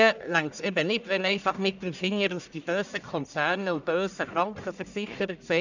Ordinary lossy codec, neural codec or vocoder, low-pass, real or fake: none; codec, 44.1 kHz, 1.7 kbps, Pupu-Codec; 7.2 kHz; fake